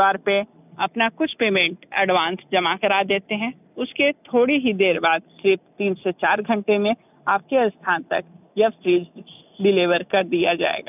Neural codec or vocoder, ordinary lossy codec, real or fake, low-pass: none; none; real; 3.6 kHz